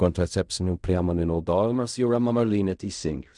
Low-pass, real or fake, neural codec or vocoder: 10.8 kHz; fake; codec, 16 kHz in and 24 kHz out, 0.4 kbps, LongCat-Audio-Codec, fine tuned four codebook decoder